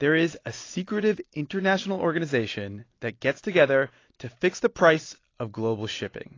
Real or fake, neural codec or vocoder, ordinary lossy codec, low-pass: real; none; AAC, 32 kbps; 7.2 kHz